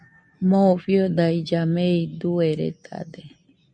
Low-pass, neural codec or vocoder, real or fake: 9.9 kHz; none; real